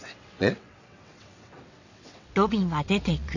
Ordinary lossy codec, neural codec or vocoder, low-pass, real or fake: none; vocoder, 22.05 kHz, 80 mel bands, WaveNeXt; 7.2 kHz; fake